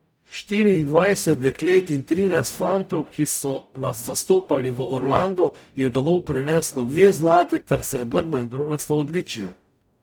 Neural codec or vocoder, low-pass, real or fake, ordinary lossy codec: codec, 44.1 kHz, 0.9 kbps, DAC; none; fake; none